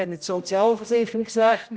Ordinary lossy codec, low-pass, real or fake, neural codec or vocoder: none; none; fake; codec, 16 kHz, 0.5 kbps, X-Codec, HuBERT features, trained on general audio